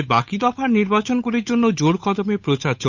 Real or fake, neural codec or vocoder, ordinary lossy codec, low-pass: fake; codec, 16 kHz, 8 kbps, FunCodec, trained on Chinese and English, 25 frames a second; none; 7.2 kHz